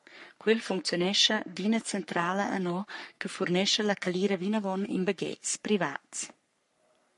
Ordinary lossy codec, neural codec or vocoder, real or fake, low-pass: MP3, 48 kbps; codec, 44.1 kHz, 3.4 kbps, Pupu-Codec; fake; 14.4 kHz